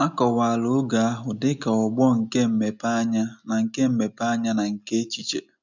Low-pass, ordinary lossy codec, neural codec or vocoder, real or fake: 7.2 kHz; none; none; real